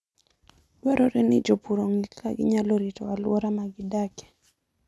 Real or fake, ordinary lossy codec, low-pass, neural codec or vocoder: real; none; none; none